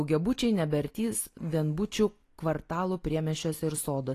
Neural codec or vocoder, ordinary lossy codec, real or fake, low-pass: none; AAC, 48 kbps; real; 14.4 kHz